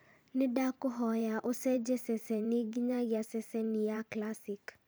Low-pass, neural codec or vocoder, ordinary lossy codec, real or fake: none; vocoder, 44.1 kHz, 128 mel bands every 512 samples, BigVGAN v2; none; fake